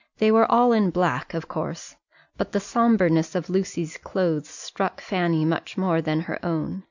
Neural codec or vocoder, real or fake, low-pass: none; real; 7.2 kHz